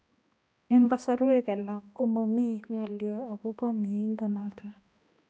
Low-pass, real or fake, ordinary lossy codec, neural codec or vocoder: none; fake; none; codec, 16 kHz, 1 kbps, X-Codec, HuBERT features, trained on balanced general audio